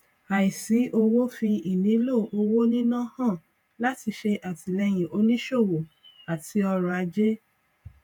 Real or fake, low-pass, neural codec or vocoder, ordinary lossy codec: fake; 19.8 kHz; vocoder, 48 kHz, 128 mel bands, Vocos; none